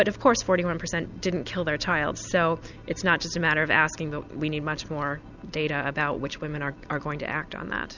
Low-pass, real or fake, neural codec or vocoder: 7.2 kHz; real; none